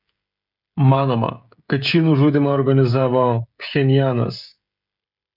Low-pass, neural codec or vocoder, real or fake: 5.4 kHz; codec, 16 kHz, 16 kbps, FreqCodec, smaller model; fake